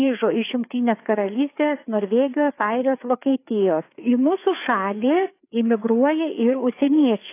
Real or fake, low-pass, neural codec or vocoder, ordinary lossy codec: fake; 3.6 kHz; codec, 16 kHz, 4 kbps, FreqCodec, larger model; AAC, 24 kbps